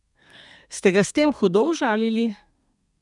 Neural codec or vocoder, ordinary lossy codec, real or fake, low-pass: codec, 32 kHz, 1.9 kbps, SNAC; none; fake; 10.8 kHz